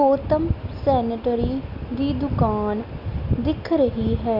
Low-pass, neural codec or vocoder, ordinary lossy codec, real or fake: 5.4 kHz; none; none; real